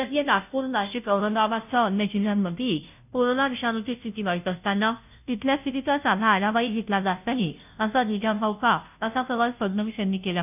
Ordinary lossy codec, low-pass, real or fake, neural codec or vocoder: none; 3.6 kHz; fake; codec, 16 kHz, 0.5 kbps, FunCodec, trained on Chinese and English, 25 frames a second